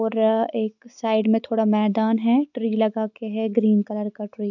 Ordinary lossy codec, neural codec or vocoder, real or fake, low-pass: none; none; real; 7.2 kHz